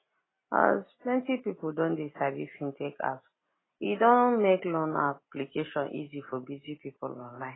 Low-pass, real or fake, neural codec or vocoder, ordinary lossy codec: 7.2 kHz; real; none; AAC, 16 kbps